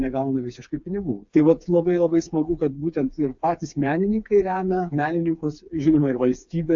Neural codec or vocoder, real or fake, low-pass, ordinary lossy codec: codec, 16 kHz, 4 kbps, FreqCodec, smaller model; fake; 7.2 kHz; AAC, 64 kbps